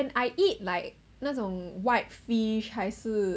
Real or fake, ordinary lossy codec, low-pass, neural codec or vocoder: real; none; none; none